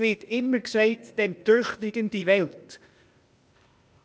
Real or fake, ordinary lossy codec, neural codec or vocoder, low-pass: fake; none; codec, 16 kHz, 0.8 kbps, ZipCodec; none